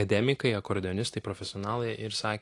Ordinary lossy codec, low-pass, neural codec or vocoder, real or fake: AAC, 48 kbps; 10.8 kHz; none; real